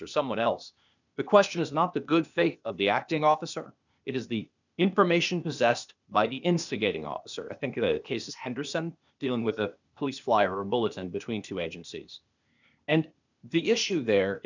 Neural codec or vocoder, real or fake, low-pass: codec, 16 kHz, 0.8 kbps, ZipCodec; fake; 7.2 kHz